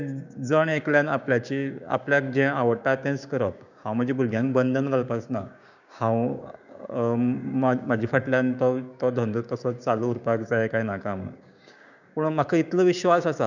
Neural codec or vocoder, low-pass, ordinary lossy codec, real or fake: codec, 16 kHz, 6 kbps, DAC; 7.2 kHz; none; fake